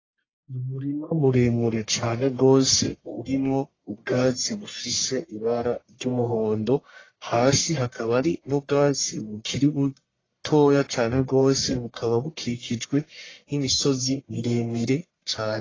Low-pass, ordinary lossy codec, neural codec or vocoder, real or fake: 7.2 kHz; AAC, 32 kbps; codec, 44.1 kHz, 1.7 kbps, Pupu-Codec; fake